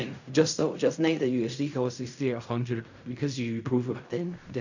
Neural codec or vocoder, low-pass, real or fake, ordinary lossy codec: codec, 16 kHz in and 24 kHz out, 0.4 kbps, LongCat-Audio-Codec, fine tuned four codebook decoder; 7.2 kHz; fake; none